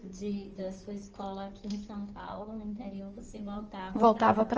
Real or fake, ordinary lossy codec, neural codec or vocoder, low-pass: fake; Opus, 24 kbps; codec, 16 kHz in and 24 kHz out, 2.2 kbps, FireRedTTS-2 codec; 7.2 kHz